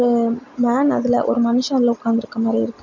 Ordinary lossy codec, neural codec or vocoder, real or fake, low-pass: none; none; real; 7.2 kHz